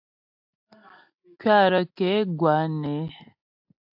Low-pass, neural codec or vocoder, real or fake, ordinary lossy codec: 5.4 kHz; none; real; AAC, 48 kbps